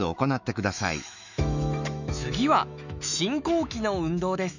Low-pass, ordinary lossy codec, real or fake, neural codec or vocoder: 7.2 kHz; none; fake; autoencoder, 48 kHz, 128 numbers a frame, DAC-VAE, trained on Japanese speech